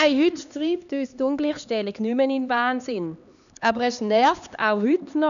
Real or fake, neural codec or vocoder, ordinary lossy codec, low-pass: fake; codec, 16 kHz, 2 kbps, X-Codec, HuBERT features, trained on LibriSpeech; none; 7.2 kHz